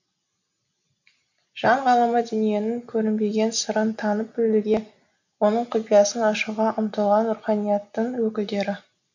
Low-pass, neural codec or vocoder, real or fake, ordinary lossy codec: 7.2 kHz; none; real; none